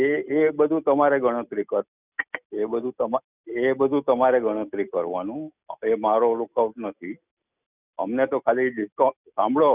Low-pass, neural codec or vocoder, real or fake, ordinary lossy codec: 3.6 kHz; none; real; none